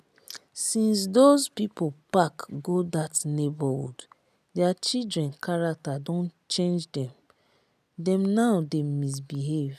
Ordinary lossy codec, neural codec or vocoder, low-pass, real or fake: none; none; 14.4 kHz; real